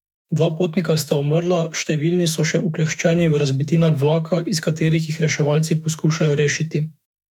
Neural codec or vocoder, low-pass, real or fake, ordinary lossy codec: autoencoder, 48 kHz, 32 numbers a frame, DAC-VAE, trained on Japanese speech; 19.8 kHz; fake; none